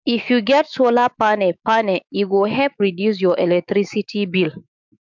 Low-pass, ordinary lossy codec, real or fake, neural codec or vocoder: 7.2 kHz; MP3, 64 kbps; fake; autoencoder, 48 kHz, 128 numbers a frame, DAC-VAE, trained on Japanese speech